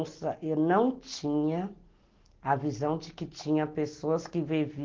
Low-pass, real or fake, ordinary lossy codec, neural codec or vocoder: 7.2 kHz; real; Opus, 16 kbps; none